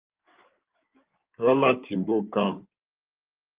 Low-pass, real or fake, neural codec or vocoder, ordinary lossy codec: 3.6 kHz; fake; codec, 16 kHz in and 24 kHz out, 2.2 kbps, FireRedTTS-2 codec; Opus, 32 kbps